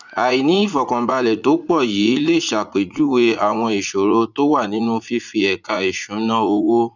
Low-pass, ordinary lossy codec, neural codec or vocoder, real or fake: 7.2 kHz; none; vocoder, 44.1 kHz, 80 mel bands, Vocos; fake